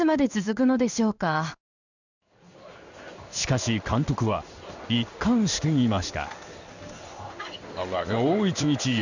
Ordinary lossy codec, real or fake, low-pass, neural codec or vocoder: none; fake; 7.2 kHz; codec, 16 kHz in and 24 kHz out, 1 kbps, XY-Tokenizer